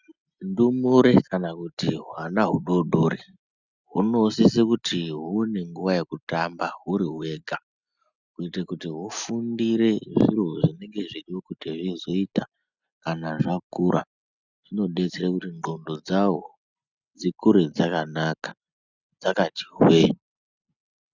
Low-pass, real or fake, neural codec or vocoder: 7.2 kHz; real; none